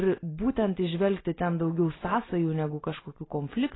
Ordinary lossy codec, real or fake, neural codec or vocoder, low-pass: AAC, 16 kbps; real; none; 7.2 kHz